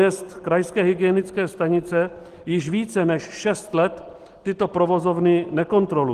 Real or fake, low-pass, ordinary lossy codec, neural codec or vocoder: real; 14.4 kHz; Opus, 16 kbps; none